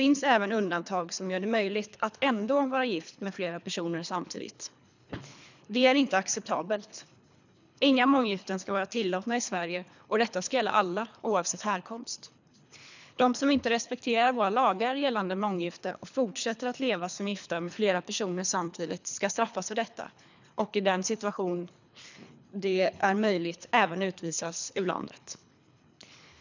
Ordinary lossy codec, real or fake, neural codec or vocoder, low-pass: none; fake; codec, 24 kHz, 3 kbps, HILCodec; 7.2 kHz